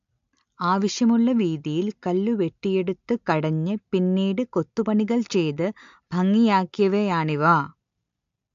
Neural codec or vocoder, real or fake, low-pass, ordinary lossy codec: none; real; 7.2 kHz; AAC, 64 kbps